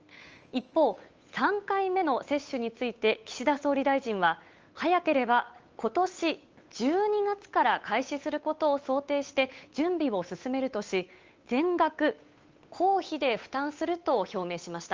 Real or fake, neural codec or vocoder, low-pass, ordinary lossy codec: fake; codec, 16 kHz, 8 kbps, FunCodec, trained on Chinese and English, 25 frames a second; 7.2 kHz; Opus, 24 kbps